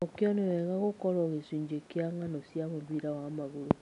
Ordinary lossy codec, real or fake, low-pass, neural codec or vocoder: none; real; 10.8 kHz; none